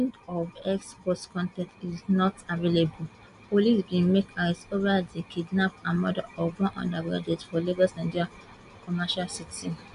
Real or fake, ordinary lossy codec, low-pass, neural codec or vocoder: real; AAC, 96 kbps; 10.8 kHz; none